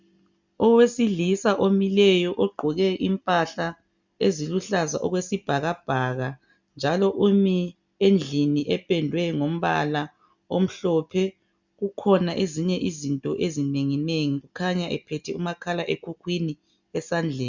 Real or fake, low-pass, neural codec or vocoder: real; 7.2 kHz; none